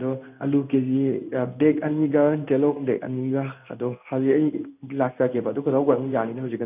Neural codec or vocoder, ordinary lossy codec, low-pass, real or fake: codec, 16 kHz in and 24 kHz out, 1 kbps, XY-Tokenizer; none; 3.6 kHz; fake